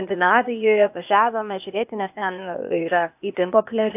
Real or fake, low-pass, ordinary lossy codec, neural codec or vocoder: fake; 3.6 kHz; AAC, 32 kbps; codec, 16 kHz, 0.8 kbps, ZipCodec